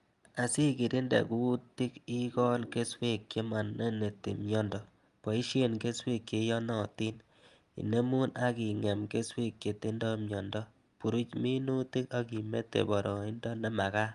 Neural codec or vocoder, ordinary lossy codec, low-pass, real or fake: none; Opus, 32 kbps; 10.8 kHz; real